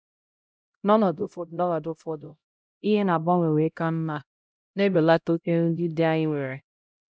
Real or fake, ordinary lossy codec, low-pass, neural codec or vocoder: fake; none; none; codec, 16 kHz, 0.5 kbps, X-Codec, HuBERT features, trained on LibriSpeech